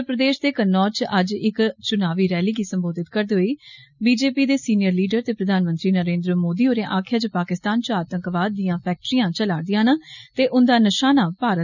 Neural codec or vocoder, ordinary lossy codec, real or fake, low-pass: none; none; real; 7.2 kHz